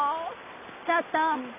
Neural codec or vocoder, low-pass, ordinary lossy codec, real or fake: none; 3.6 kHz; none; real